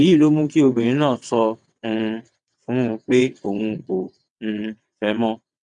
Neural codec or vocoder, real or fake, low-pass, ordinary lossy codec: vocoder, 22.05 kHz, 80 mel bands, WaveNeXt; fake; 9.9 kHz; none